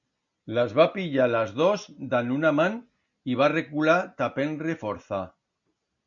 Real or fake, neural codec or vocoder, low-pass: real; none; 7.2 kHz